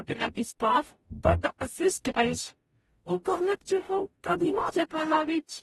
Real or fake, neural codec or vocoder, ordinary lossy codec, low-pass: fake; codec, 44.1 kHz, 0.9 kbps, DAC; AAC, 32 kbps; 19.8 kHz